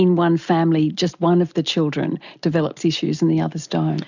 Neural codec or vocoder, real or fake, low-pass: none; real; 7.2 kHz